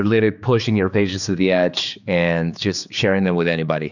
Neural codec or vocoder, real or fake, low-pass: codec, 16 kHz, 4 kbps, X-Codec, HuBERT features, trained on general audio; fake; 7.2 kHz